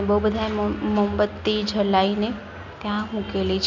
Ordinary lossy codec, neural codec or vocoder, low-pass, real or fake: none; none; 7.2 kHz; real